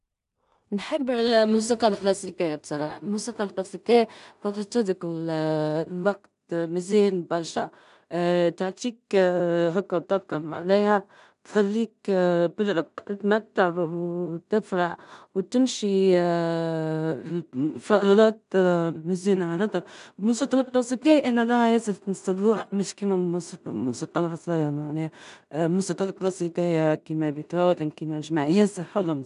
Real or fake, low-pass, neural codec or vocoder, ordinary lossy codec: fake; 10.8 kHz; codec, 16 kHz in and 24 kHz out, 0.4 kbps, LongCat-Audio-Codec, two codebook decoder; none